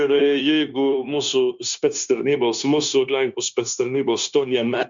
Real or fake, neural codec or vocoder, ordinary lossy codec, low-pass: fake; codec, 16 kHz, 0.9 kbps, LongCat-Audio-Codec; Opus, 64 kbps; 7.2 kHz